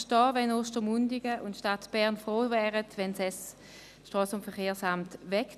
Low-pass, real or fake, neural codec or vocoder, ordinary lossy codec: 14.4 kHz; real; none; none